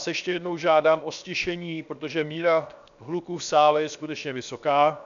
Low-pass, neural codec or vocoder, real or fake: 7.2 kHz; codec, 16 kHz, 0.7 kbps, FocalCodec; fake